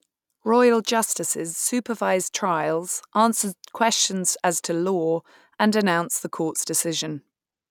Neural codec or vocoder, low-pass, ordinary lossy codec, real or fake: none; 19.8 kHz; none; real